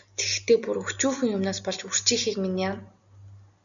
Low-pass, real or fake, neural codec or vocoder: 7.2 kHz; real; none